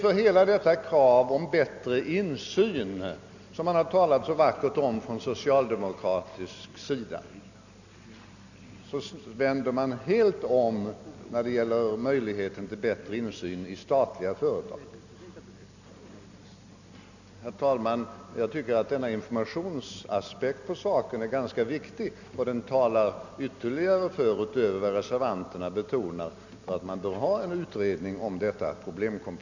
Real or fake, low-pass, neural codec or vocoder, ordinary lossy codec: real; 7.2 kHz; none; none